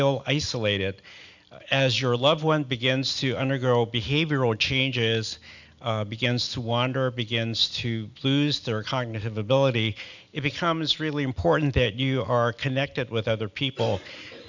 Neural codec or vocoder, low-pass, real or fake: none; 7.2 kHz; real